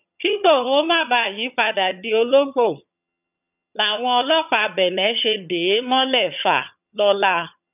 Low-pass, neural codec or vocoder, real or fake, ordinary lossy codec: 3.6 kHz; vocoder, 22.05 kHz, 80 mel bands, HiFi-GAN; fake; none